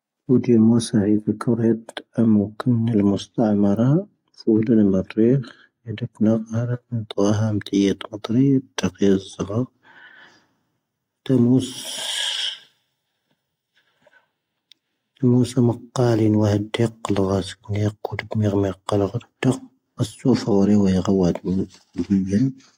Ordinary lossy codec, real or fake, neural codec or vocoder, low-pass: AAC, 48 kbps; real; none; 19.8 kHz